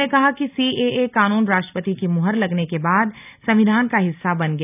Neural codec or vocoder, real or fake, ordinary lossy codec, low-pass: none; real; none; 3.6 kHz